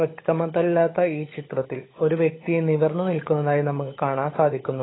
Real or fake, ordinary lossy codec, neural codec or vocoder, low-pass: fake; AAC, 16 kbps; codec, 16 kHz, 16 kbps, FunCodec, trained on LibriTTS, 50 frames a second; 7.2 kHz